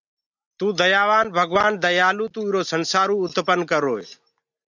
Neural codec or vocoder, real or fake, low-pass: none; real; 7.2 kHz